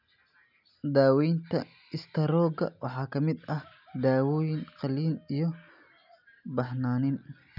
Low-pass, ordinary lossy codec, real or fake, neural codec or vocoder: 5.4 kHz; none; real; none